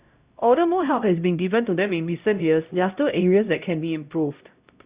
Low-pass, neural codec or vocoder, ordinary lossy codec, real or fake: 3.6 kHz; codec, 16 kHz, 0.5 kbps, X-Codec, HuBERT features, trained on LibriSpeech; Opus, 64 kbps; fake